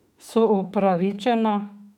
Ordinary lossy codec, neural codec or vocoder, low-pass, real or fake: none; autoencoder, 48 kHz, 32 numbers a frame, DAC-VAE, trained on Japanese speech; 19.8 kHz; fake